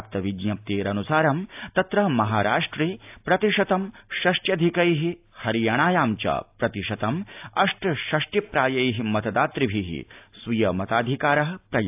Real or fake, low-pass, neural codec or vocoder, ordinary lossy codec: real; 3.6 kHz; none; AAC, 32 kbps